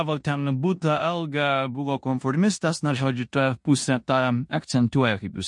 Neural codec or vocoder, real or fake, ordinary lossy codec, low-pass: codec, 16 kHz in and 24 kHz out, 0.9 kbps, LongCat-Audio-Codec, four codebook decoder; fake; MP3, 48 kbps; 10.8 kHz